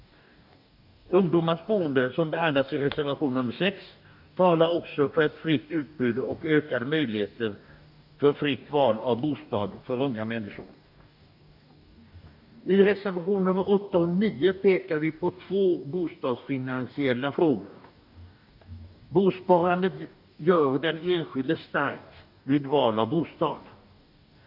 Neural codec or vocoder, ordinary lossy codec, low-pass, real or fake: codec, 44.1 kHz, 2.6 kbps, DAC; none; 5.4 kHz; fake